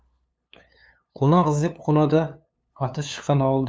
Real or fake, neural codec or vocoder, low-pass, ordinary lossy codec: fake; codec, 16 kHz, 2 kbps, FunCodec, trained on LibriTTS, 25 frames a second; none; none